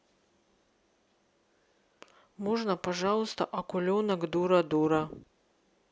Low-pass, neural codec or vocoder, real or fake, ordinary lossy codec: none; none; real; none